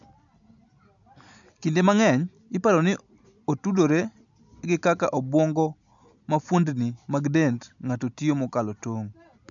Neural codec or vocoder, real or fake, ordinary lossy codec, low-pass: none; real; none; 7.2 kHz